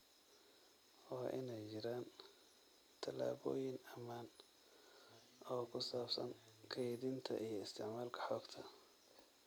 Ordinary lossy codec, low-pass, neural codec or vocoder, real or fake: none; none; none; real